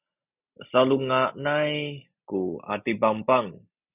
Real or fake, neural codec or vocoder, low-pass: real; none; 3.6 kHz